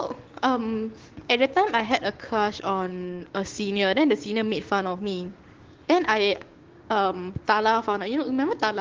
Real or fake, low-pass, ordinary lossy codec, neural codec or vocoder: fake; 7.2 kHz; Opus, 16 kbps; codec, 16 kHz, 6 kbps, DAC